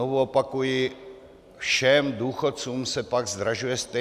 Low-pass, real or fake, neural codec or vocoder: 14.4 kHz; real; none